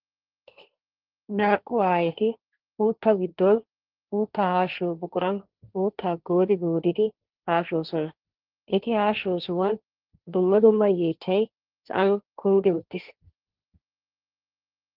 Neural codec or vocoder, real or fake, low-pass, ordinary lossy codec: codec, 16 kHz, 1.1 kbps, Voila-Tokenizer; fake; 5.4 kHz; Opus, 32 kbps